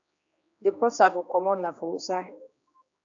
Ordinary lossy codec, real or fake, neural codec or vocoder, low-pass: AAC, 64 kbps; fake; codec, 16 kHz, 2 kbps, X-Codec, HuBERT features, trained on general audio; 7.2 kHz